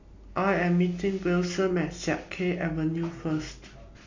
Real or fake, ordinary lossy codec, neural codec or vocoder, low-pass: real; MP3, 48 kbps; none; 7.2 kHz